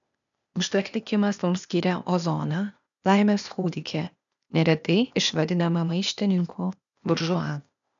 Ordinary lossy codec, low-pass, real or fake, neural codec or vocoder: MP3, 96 kbps; 7.2 kHz; fake; codec, 16 kHz, 0.8 kbps, ZipCodec